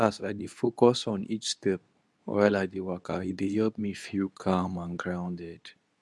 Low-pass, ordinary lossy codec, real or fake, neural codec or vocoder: none; none; fake; codec, 24 kHz, 0.9 kbps, WavTokenizer, medium speech release version 2